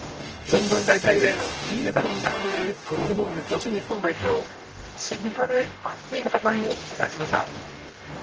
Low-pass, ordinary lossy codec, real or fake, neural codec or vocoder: 7.2 kHz; Opus, 16 kbps; fake; codec, 44.1 kHz, 0.9 kbps, DAC